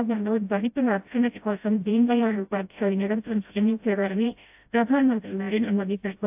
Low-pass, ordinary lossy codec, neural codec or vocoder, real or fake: 3.6 kHz; AAC, 32 kbps; codec, 16 kHz, 0.5 kbps, FreqCodec, smaller model; fake